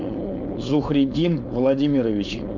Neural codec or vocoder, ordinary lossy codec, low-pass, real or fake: codec, 16 kHz, 4.8 kbps, FACodec; MP3, 64 kbps; 7.2 kHz; fake